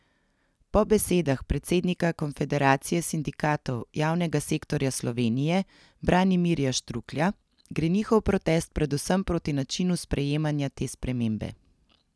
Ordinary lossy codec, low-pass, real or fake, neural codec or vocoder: none; none; real; none